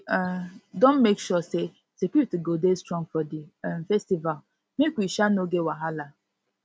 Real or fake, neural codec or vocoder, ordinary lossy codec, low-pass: real; none; none; none